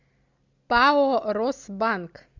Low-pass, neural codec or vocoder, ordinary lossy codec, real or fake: 7.2 kHz; none; MP3, 64 kbps; real